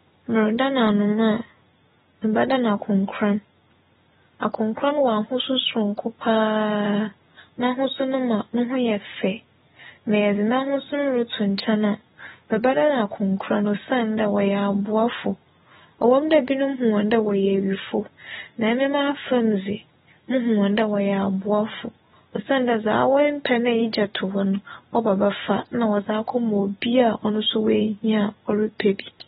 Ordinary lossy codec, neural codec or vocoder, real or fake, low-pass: AAC, 16 kbps; none; real; 10.8 kHz